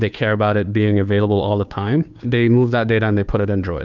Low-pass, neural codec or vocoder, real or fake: 7.2 kHz; codec, 16 kHz, 2 kbps, FunCodec, trained on Chinese and English, 25 frames a second; fake